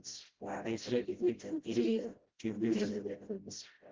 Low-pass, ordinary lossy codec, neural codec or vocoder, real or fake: 7.2 kHz; Opus, 16 kbps; codec, 16 kHz, 0.5 kbps, FreqCodec, smaller model; fake